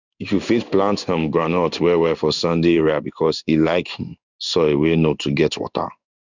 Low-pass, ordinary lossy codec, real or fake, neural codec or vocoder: 7.2 kHz; none; fake; codec, 16 kHz in and 24 kHz out, 1 kbps, XY-Tokenizer